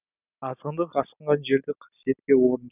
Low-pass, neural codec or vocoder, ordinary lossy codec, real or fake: 3.6 kHz; none; none; real